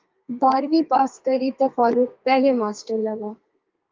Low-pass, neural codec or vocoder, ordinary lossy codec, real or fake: 7.2 kHz; codec, 32 kHz, 1.9 kbps, SNAC; Opus, 24 kbps; fake